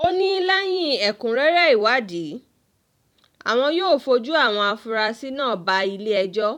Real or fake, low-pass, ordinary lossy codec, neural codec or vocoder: fake; 19.8 kHz; none; vocoder, 44.1 kHz, 128 mel bands every 256 samples, BigVGAN v2